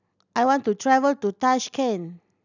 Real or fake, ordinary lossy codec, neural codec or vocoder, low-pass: real; none; none; 7.2 kHz